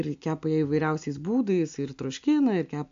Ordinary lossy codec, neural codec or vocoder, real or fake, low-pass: AAC, 64 kbps; none; real; 7.2 kHz